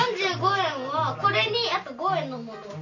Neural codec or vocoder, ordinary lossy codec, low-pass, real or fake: none; none; 7.2 kHz; real